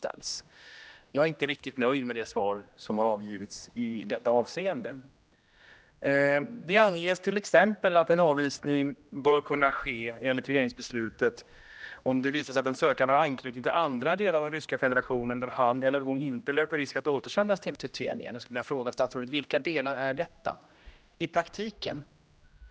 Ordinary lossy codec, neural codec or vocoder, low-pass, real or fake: none; codec, 16 kHz, 1 kbps, X-Codec, HuBERT features, trained on general audio; none; fake